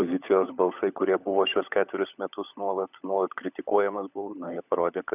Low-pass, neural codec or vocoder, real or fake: 3.6 kHz; codec, 16 kHz, 16 kbps, FunCodec, trained on LibriTTS, 50 frames a second; fake